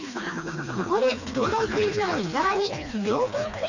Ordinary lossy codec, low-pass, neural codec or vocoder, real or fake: none; 7.2 kHz; codec, 16 kHz, 2 kbps, FreqCodec, smaller model; fake